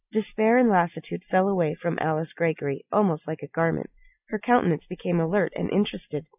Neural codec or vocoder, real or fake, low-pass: none; real; 3.6 kHz